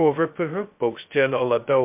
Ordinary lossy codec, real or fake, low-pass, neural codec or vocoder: MP3, 32 kbps; fake; 3.6 kHz; codec, 16 kHz, 0.2 kbps, FocalCodec